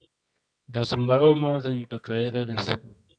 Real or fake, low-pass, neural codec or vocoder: fake; 9.9 kHz; codec, 24 kHz, 0.9 kbps, WavTokenizer, medium music audio release